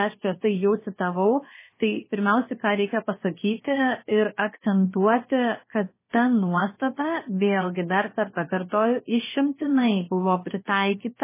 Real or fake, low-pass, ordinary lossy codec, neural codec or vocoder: fake; 3.6 kHz; MP3, 16 kbps; codec, 16 kHz, 0.7 kbps, FocalCodec